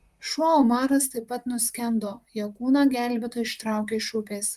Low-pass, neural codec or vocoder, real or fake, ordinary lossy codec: 14.4 kHz; vocoder, 44.1 kHz, 128 mel bands, Pupu-Vocoder; fake; Opus, 32 kbps